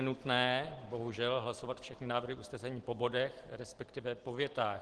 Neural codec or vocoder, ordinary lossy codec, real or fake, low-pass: none; Opus, 16 kbps; real; 10.8 kHz